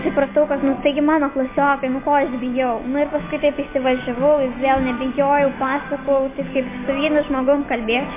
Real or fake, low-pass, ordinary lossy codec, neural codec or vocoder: real; 3.6 kHz; MP3, 24 kbps; none